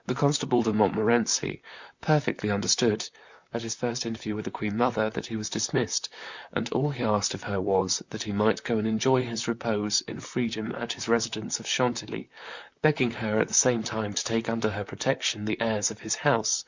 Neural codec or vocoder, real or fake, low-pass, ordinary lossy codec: vocoder, 44.1 kHz, 128 mel bands, Pupu-Vocoder; fake; 7.2 kHz; Opus, 64 kbps